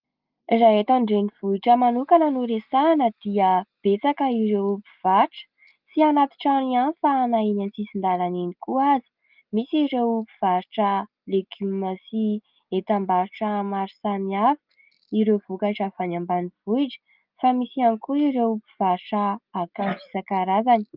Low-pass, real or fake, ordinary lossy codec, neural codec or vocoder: 5.4 kHz; real; Opus, 32 kbps; none